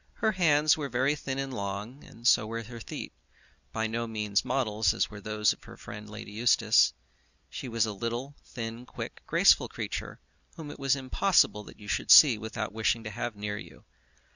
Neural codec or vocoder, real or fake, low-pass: none; real; 7.2 kHz